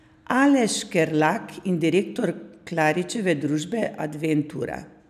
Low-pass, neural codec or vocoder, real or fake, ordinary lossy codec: 14.4 kHz; none; real; none